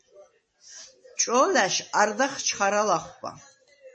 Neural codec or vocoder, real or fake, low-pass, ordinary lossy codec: none; real; 9.9 kHz; MP3, 32 kbps